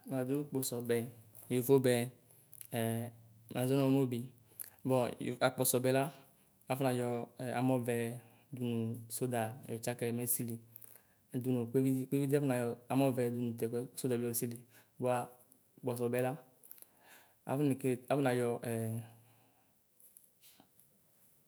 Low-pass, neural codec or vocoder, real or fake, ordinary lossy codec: none; autoencoder, 48 kHz, 128 numbers a frame, DAC-VAE, trained on Japanese speech; fake; none